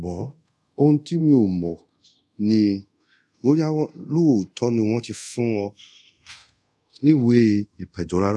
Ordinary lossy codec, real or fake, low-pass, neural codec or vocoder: none; fake; none; codec, 24 kHz, 0.5 kbps, DualCodec